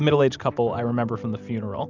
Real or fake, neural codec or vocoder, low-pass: real; none; 7.2 kHz